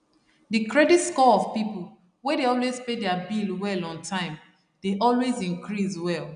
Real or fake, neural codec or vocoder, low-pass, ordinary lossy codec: real; none; 9.9 kHz; none